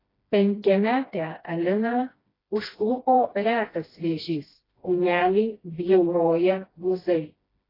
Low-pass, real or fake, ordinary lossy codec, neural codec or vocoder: 5.4 kHz; fake; AAC, 24 kbps; codec, 16 kHz, 1 kbps, FreqCodec, smaller model